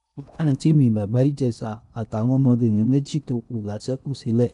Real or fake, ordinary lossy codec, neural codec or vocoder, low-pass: fake; none; codec, 16 kHz in and 24 kHz out, 0.8 kbps, FocalCodec, streaming, 65536 codes; 10.8 kHz